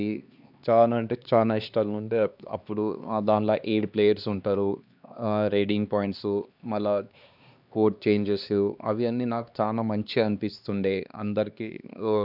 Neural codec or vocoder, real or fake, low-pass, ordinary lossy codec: codec, 16 kHz, 2 kbps, X-Codec, HuBERT features, trained on LibriSpeech; fake; 5.4 kHz; none